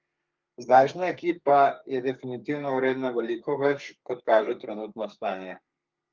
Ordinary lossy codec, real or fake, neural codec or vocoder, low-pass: Opus, 32 kbps; fake; codec, 32 kHz, 1.9 kbps, SNAC; 7.2 kHz